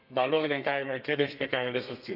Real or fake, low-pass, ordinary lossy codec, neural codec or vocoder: fake; 5.4 kHz; none; codec, 32 kHz, 1.9 kbps, SNAC